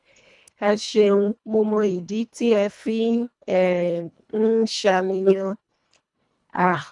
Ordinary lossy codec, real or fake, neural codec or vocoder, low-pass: none; fake; codec, 24 kHz, 1.5 kbps, HILCodec; 10.8 kHz